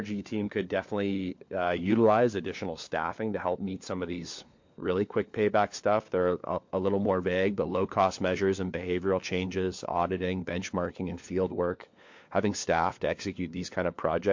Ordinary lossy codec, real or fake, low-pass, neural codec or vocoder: MP3, 48 kbps; fake; 7.2 kHz; codec, 16 kHz, 4 kbps, FunCodec, trained on LibriTTS, 50 frames a second